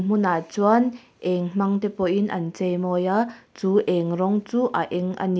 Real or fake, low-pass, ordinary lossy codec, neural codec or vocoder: real; none; none; none